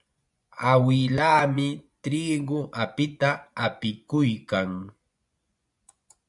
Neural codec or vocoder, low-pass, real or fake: vocoder, 24 kHz, 100 mel bands, Vocos; 10.8 kHz; fake